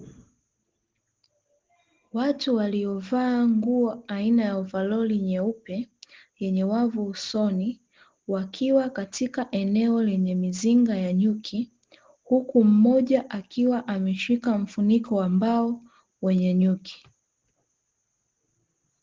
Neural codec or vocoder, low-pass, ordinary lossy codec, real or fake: none; 7.2 kHz; Opus, 16 kbps; real